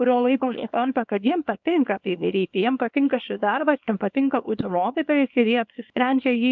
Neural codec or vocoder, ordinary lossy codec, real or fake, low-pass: codec, 24 kHz, 0.9 kbps, WavTokenizer, small release; MP3, 64 kbps; fake; 7.2 kHz